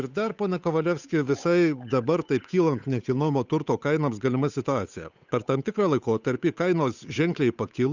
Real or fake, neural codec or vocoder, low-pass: fake; codec, 16 kHz, 8 kbps, FunCodec, trained on Chinese and English, 25 frames a second; 7.2 kHz